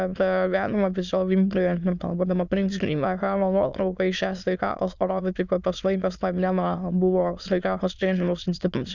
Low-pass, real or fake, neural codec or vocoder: 7.2 kHz; fake; autoencoder, 22.05 kHz, a latent of 192 numbers a frame, VITS, trained on many speakers